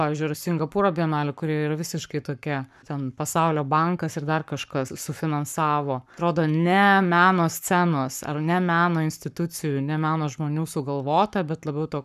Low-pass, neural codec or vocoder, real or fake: 14.4 kHz; codec, 44.1 kHz, 7.8 kbps, Pupu-Codec; fake